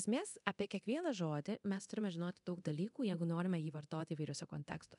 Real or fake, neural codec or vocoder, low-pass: fake; codec, 24 kHz, 0.9 kbps, DualCodec; 10.8 kHz